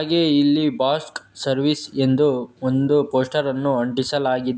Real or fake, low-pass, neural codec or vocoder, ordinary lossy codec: real; none; none; none